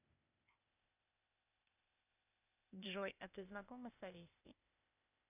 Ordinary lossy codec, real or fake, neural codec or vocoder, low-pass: none; fake; codec, 16 kHz, 0.8 kbps, ZipCodec; 3.6 kHz